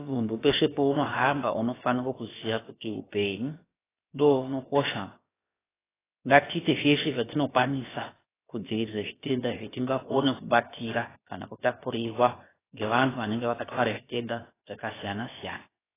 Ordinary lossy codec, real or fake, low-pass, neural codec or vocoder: AAC, 16 kbps; fake; 3.6 kHz; codec, 16 kHz, about 1 kbps, DyCAST, with the encoder's durations